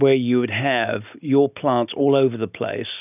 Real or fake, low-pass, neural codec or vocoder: real; 3.6 kHz; none